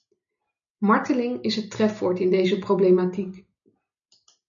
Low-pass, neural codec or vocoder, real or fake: 7.2 kHz; none; real